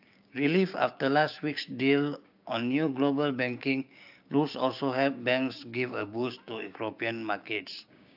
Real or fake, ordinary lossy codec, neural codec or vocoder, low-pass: fake; AAC, 48 kbps; codec, 16 kHz, 6 kbps, DAC; 5.4 kHz